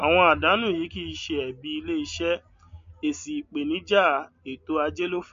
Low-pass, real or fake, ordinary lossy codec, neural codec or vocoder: 7.2 kHz; real; MP3, 48 kbps; none